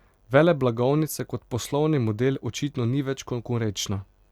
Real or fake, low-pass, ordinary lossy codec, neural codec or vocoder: real; 19.8 kHz; Opus, 64 kbps; none